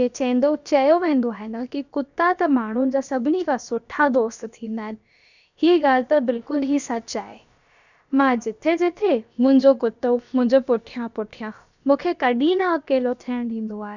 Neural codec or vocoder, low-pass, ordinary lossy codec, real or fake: codec, 16 kHz, about 1 kbps, DyCAST, with the encoder's durations; 7.2 kHz; none; fake